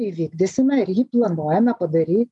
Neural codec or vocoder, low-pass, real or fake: none; 10.8 kHz; real